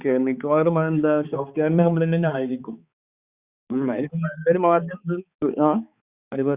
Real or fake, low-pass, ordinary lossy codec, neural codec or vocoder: fake; 3.6 kHz; Opus, 64 kbps; codec, 16 kHz, 4 kbps, X-Codec, HuBERT features, trained on balanced general audio